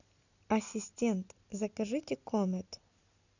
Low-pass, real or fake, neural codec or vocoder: 7.2 kHz; real; none